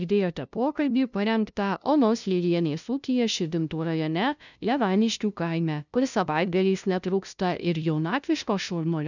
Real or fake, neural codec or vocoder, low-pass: fake; codec, 16 kHz, 0.5 kbps, FunCodec, trained on LibriTTS, 25 frames a second; 7.2 kHz